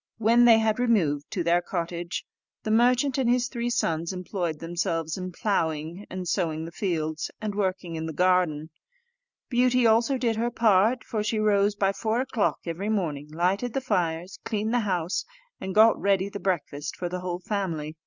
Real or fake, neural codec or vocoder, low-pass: real; none; 7.2 kHz